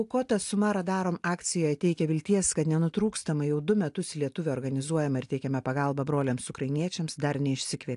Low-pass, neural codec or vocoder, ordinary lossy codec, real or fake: 10.8 kHz; none; AAC, 64 kbps; real